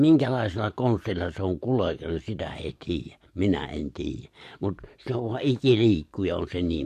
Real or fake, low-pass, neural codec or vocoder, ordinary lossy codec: fake; 14.4 kHz; codec, 44.1 kHz, 7.8 kbps, DAC; MP3, 64 kbps